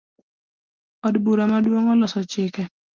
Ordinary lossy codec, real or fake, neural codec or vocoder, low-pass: Opus, 24 kbps; real; none; 7.2 kHz